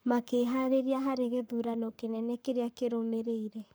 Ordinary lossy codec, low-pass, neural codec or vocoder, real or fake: none; none; codec, 44.1 kHz, 7.8 kbps, Pupu-Codec; fake